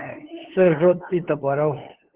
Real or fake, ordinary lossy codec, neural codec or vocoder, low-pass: fake; Opus, 16 kbps; codec, 16 kHz, 2 kbps, FunCodec, trained on LibriTTS, 25 frames a second; 3.6 kHz